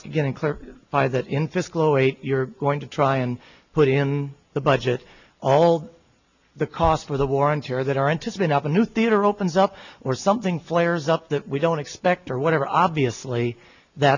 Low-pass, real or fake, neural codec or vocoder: 7.2 kHz; real; none